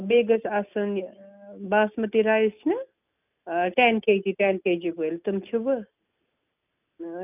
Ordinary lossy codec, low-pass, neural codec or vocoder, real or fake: none; 3.6 kHz; none; real